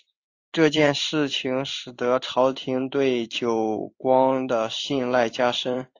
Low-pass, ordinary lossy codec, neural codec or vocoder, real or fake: 7.2 kHz; AAC, 48 kbps; codec, 16 kHz in and 24 kHz out, 1 kbps, XY-Tokenizer; fake